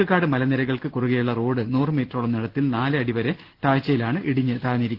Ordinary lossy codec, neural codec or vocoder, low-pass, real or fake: Opus, 16 kbps; none; 5.4 kHz; real